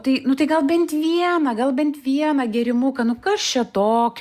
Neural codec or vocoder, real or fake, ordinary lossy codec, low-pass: none; real; Opus, 64 kbps; 14.4 kHz